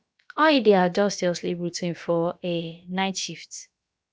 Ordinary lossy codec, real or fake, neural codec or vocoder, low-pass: none; fake; codec, 16 kHz, about 1 kbps, DyCAST, with the encoder's durations; none